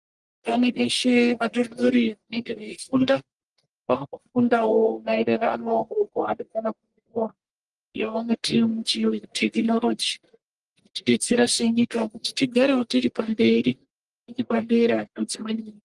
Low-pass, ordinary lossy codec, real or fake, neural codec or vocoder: 10.8 kHz; Opus, 24 kbps; fake; codec, 44.1 kHz, 1.7 kbps, Pupu-Codec